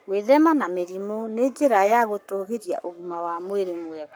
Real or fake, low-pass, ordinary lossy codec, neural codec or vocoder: fake; none; none; codec, 44.1 kHz, 7.8 kbps, Pupu-Codec